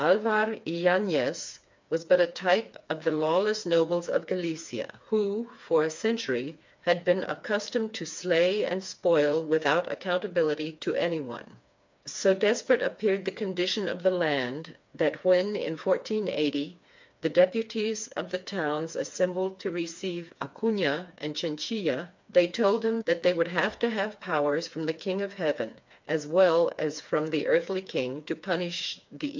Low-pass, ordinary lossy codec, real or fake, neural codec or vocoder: 7.2 kHz; MP3, 64 kbps; fake; codec, 16 kHz, 4 kbps, FreqCodec, smaller model